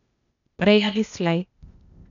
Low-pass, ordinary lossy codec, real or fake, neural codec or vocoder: 7.2 kHz; none; fake; codec, 16 kHz, 0.8 kbps, ZipCodec